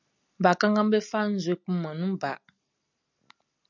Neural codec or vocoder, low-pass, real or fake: none; 7.2 kHz; real